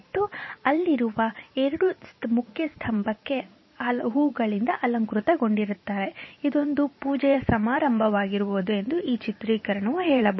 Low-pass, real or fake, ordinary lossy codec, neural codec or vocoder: 7.2 kHz; fake; MP3, 24 kbps; vocoder, 44.1 kHz, 128 mel bands every 512 samples, BigVGAN v2